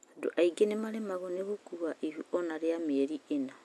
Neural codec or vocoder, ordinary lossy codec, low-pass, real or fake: none; none; none; real